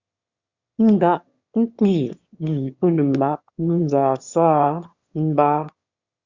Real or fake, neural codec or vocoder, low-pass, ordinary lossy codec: fake; autoencoder, 22.05 kHz, a latent of 192 numbers a frame, VITS, trained on one speaker; 7.2 kHz; Opus, 64 kbps